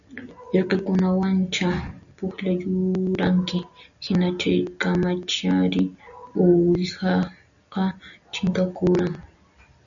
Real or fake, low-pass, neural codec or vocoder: real; 7.2 kHz; none